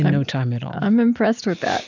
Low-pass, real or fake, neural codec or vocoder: 7.2 kHz; real; none